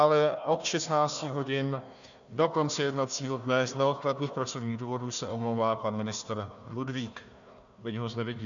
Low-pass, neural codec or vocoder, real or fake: 7.2 kHz; codec, 16 kHz, 1 kbps, FunCodec, trained on Chinese and English, 50 frames a second; fake